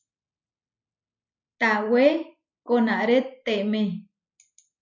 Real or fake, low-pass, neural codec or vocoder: real; 7.2 kHz; none